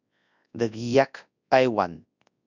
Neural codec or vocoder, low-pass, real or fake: codec, 24 kHz, 0.9 kbps, WavTokenizer, large speech release; 7.2 kHz; fake